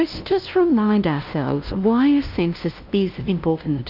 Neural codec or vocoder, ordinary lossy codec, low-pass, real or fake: codec, 16 kHz, 0.5 kbps, FunCodec, trained on LibriTTS, 25 frames a second; Opus, 32 kbps; 5.4 kHz; fake